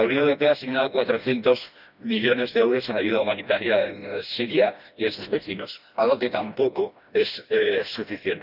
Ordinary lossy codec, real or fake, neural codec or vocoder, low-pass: none; fake; codec, 16 kHz, 1 kbps, FreqCodec, smaller model; 5.4 kHz